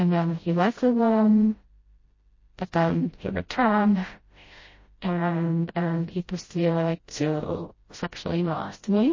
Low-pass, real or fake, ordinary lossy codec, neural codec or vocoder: 7.2 kHz; fake; MP3, 32 kbps; codec, 16 kHz, 0.5 kbps, FreqCodec, smaller model